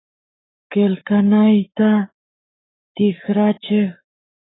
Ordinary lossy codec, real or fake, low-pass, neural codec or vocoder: AAC, 16 kbps; fake; 7.2 kHz; codec, 44.1 kHz, 7.8 kbps, Pupu-Codec